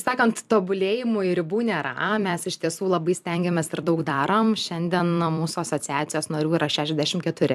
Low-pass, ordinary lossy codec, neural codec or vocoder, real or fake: 14.4 kHz; AAC, 96 kbps; vocoder, 44.1 kHz, 128 mel bands every 256 samples, BigVGAN v2; fake